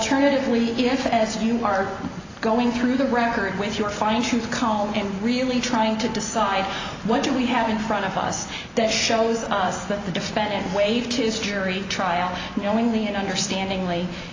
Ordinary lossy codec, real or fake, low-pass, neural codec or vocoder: AAC, 32 kbps; real; 7.2 kHz; none